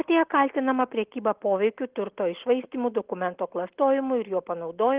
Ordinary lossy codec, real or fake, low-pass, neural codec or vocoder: Opus, 16 kbps; real; 3.6 kHz; none